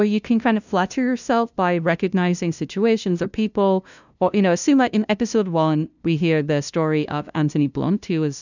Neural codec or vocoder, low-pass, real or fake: codec, 16 kHz, 0.5 kbps, FunCodec, trained on LibriTTS, 25 frames a second; 7.2 kHz; fake